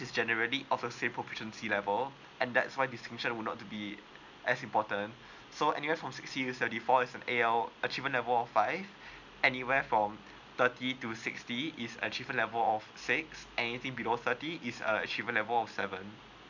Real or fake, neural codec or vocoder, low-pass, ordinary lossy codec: real; none; 7.2 kHz; none